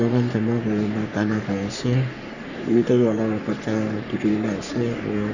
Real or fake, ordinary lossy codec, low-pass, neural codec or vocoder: fake; none; 7.2 kHz; codec, 44.1 kHz, 3.4 kbps, Pupu-Codec